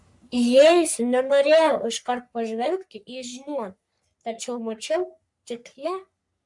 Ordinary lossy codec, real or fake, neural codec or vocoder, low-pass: MP3, 64 kbps; fake; codec, 44.1 kHz, 3.4 kbps, Pupu-Codec; 10.8 kHz